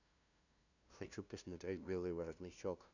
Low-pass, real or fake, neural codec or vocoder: 7.2 kHz; fake; codec, 16 kHz, 0.5 kbps, FunCodec, trained on LibriTTS, 25 frames a second